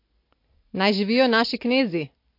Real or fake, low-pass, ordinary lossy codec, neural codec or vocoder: real; 5.4 kHz; MP3, 32 kbps; none